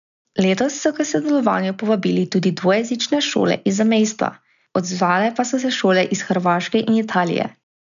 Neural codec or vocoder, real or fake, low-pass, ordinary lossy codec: none; real; 7.2 kHz; none